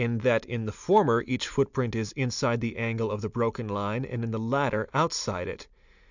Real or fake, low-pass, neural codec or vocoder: real; 7.2 kHz; none